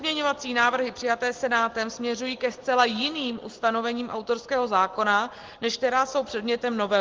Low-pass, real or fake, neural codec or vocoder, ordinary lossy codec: 7.2 kHz; real; none; Opus, 16 kbps